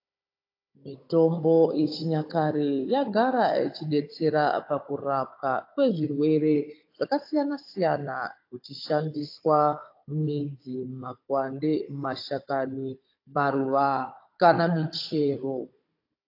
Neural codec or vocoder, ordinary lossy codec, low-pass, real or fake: codec, 16 kHz, 16 kbps, FunCodec, trained on Chinese and English, 50 frames a second; AAC, 32 kbps; 5.4 kHz; fake